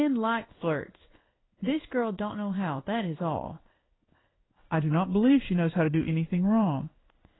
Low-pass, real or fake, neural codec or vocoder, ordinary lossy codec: 7.2 kHz; fake; codec, 16 kHz in and 24 kHz out, 1 kbps, XY-Tokenizer; AAC, 16 kbps